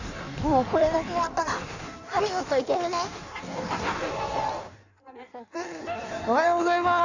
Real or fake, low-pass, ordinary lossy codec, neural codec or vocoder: fake; 7.2 kHz; none; codec, 16 kHz in and 24 kHz out, 1.1 kbps, FireRedTTS-2 codec